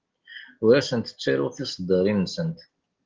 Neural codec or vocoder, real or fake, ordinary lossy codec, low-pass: none; real; Opus, 16 kbps; 7.2 kHz